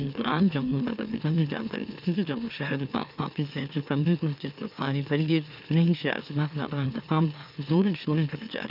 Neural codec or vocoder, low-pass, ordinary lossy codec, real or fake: autoencoder, 44.1 kHz, a latent of 192 numbers a frame, MeloTTS; 5.4 kHz; none; fake